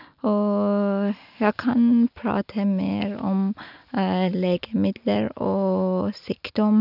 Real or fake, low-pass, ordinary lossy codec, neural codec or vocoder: real; 5.4 kHz; none; none